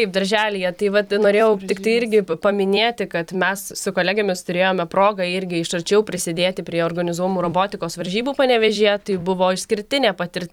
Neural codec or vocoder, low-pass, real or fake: vocoder, 44.1 kHz, 128 mel bands every 256 samples, BigVGAN v2; 19.8 kHz; fake